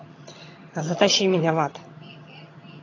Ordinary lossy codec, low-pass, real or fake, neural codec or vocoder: AAC, 32 kbps; 7.2 kHz; fake; vocoder, 22.05 kHz, 80 mel bands, HiFi-GAN